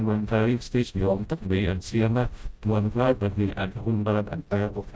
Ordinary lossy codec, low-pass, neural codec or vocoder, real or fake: none; none; codec, 16 kHz, 0.5 kbps, FreqCodec, smaller model; fake